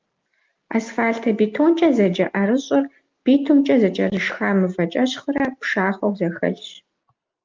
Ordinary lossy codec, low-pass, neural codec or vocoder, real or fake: Opus, 32 kbps; 7.2 kHz; none; real